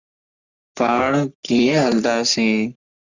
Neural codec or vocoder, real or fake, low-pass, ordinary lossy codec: codec, 44.1 kHz, 3.4 kbps, Pupu-Codec; fake; 7.2 kHz; Opus, 64 kbps